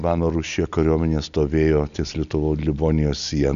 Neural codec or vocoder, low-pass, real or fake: none; 7.2 kHz; real